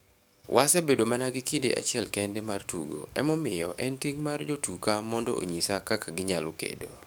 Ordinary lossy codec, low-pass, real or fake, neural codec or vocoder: none; none; fake; codec, 44.1 kHz, 7.8 kbps, DAC